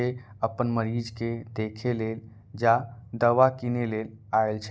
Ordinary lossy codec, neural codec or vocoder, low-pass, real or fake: none; none; none; real